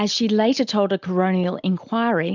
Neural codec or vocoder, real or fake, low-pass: none; real; 7.2 kHz